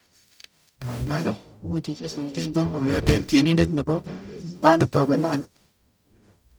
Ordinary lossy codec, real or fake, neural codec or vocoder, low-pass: none; fake; codec, 44.1 kHz, 0.9 kbps, DAC; none